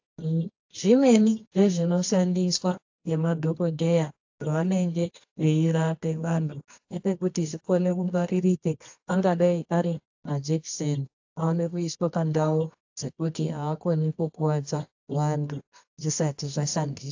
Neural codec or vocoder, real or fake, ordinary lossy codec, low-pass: codec, 24 kHz, 0.9 kbps, WavTokenizer, medium music audio release; fake; AAC, 48 kbps; 7.2 kHz